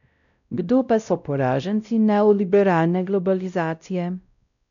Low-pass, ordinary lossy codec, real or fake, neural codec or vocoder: 7.2 kHz; none; fake; codec, 16 kHz, 0.5 kbps, X-Codec, WavLM features, trained on Multilingual LibriSpeech